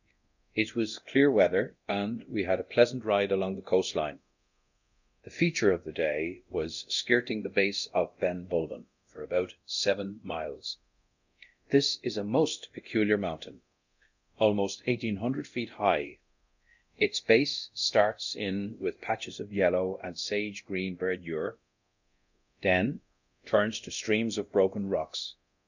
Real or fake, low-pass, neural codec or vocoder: fake; 7.2 kHz; codec, 24 kHz, 0.9 kbps, DualCodec